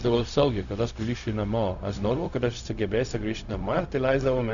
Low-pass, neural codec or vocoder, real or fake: 7.2 kHz; codec, 16 kHz, 0.4 kbps, LongCat-Audio-Codec; fake